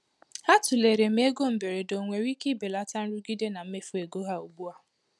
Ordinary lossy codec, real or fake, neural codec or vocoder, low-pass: none; real; none; none